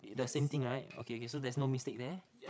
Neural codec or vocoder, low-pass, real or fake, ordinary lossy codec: codec, 16 kHz, 8 kbps, FreqCodec, larger model; none; fake; none